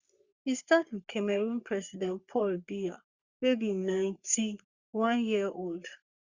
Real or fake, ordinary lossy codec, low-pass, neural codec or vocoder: fake; Opus, 64 kbps; 7.2 kHz; codec, 44.1 kHz, 3.4 kbps, Pupu-Codec